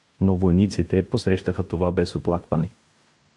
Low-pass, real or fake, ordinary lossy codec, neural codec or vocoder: 10.8 kHz; fake; AAC, 64 kbps; codec, 16 kHz in and 24 kHz out, 0.9 kbps, LongCat-Audio-Codec, fine tuned four codebook decoder